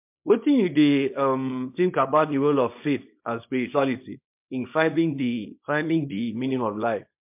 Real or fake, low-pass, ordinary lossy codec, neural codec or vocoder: fake; 3.6 kHz; MP3, 32 kbps; codec, 24 kHz, 0.9 kbps, WavTokenizer, small release